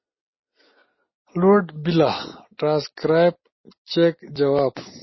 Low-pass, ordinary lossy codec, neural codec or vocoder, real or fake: 7.2 kHz; MP3, 24 kbps; none; real